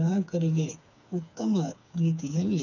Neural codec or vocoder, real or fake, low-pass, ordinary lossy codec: codec, 16 kHz, 4 kbps, FreqCodec, smaller model; fake; 7.2 kHz; none